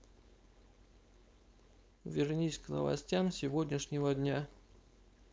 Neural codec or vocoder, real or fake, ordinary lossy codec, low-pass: codec, 16 kHz, 4.8 kbps, FACodec; fake; none; none